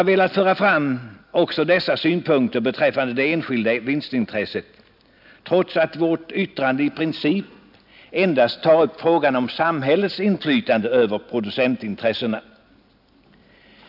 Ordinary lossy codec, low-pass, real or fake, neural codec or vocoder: none; 5.4 kHz; real; none